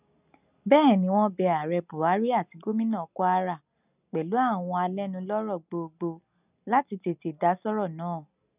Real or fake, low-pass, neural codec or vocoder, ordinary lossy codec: real; 3.6 kHz; none; none